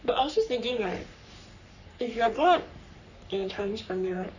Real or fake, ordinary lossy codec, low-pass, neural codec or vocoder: fake; none; 7.2 kHz; codec, 44.1 kHz, 3.4 kbps, Pupu-Codec